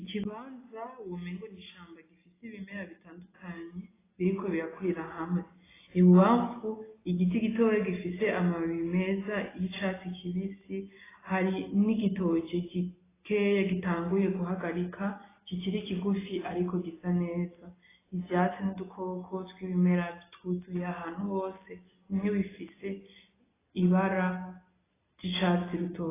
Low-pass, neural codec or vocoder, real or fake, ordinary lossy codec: 3.6 kHz; none; real; AAC, 16 kbps